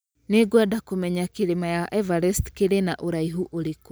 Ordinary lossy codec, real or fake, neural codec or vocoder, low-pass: none; real; none; none